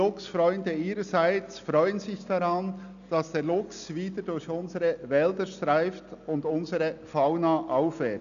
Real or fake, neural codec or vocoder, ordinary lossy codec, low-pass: real; none; none; 7.2 kHz